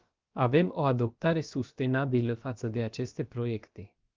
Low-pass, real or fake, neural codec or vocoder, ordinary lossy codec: 7.2 kHz; fake; codec, 16 kHz, about 1 kbps, DyCAST, with the encoder's durations; Opus, 24 kbps